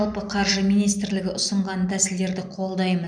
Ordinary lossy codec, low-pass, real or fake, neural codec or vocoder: none; 9.9 kHz; real; none